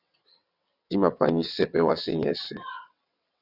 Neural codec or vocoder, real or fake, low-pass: vocoder, 22.05 kHz, 80 mel bands, WaveNeXt; fake; 5.4 kHz